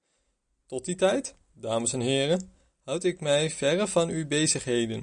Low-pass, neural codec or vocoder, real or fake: 9.9 kHz; none; real